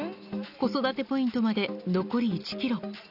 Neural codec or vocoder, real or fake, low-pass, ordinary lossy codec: none; real; 5.4 kHz; none